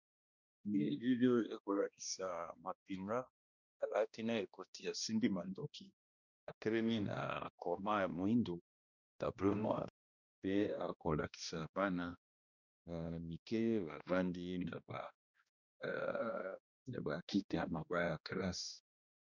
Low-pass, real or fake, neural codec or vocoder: 7.2 kHz; fake; codec, 16 kHz, 1 kbps, X-Codec, HuBERT features, trained on balanced general audio